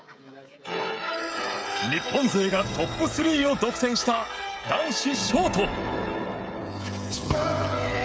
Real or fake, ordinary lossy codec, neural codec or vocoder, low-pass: fake; none; codec, 16 kHz, 16 kbps, FreqCodec, smaller model; none